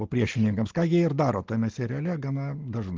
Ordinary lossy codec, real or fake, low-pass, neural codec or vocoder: Opus, 16 kbps; real; 7.2 kHz; none